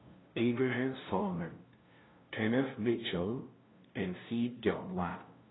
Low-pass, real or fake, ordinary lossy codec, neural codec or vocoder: 7.2 kHz; fake; AAC, 16 kbps; codec, 16 kHz, 0.5 kbps, FunCodec, trained on LibriTTS, 25 frames a second